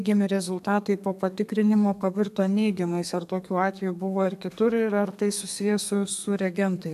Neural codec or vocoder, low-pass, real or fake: codec, 32 kHz, 1.9 kbps, SNAC; 14.4 kHz; fake